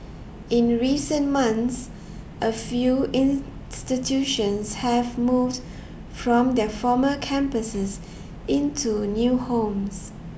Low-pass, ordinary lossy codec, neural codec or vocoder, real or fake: none; none; none; real